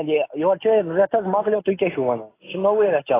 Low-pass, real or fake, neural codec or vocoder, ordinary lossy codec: 3.6 kHz; real; none; AAC, 16 kbps